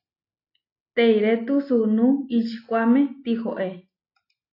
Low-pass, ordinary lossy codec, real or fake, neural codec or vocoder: 5.4 kHz; AAC, 32 kbps; real; none